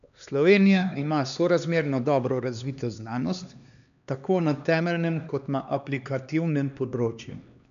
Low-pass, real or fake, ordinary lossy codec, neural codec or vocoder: 7.2 kHz; fake; none; codec, 16 kHz, 2 kbps, X-Codec, HuBERT features, trained on LibriSpeech